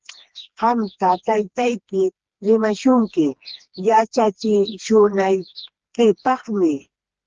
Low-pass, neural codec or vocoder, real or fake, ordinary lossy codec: 7.2 kHz; codec, 16 kHz, 2 kbps, FreqCodec, smaller model; fake; Opus, 16 kbps